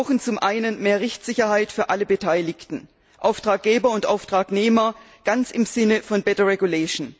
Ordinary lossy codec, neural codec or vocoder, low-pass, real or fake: none; none; none; real